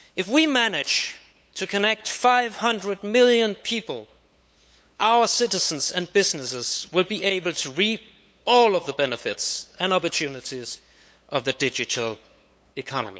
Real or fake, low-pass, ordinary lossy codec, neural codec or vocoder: fake; none; none; codec, 16 kHz, 8 kbps, FunCodec, trained on LibriTTS, 25 frames a second